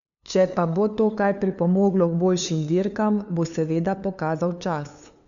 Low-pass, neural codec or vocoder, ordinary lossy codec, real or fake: 7.2 kHz; codec, 16 kHz, 2 kbps, FunCodec, trained on LibriTTS, 25 frames a second; none; fake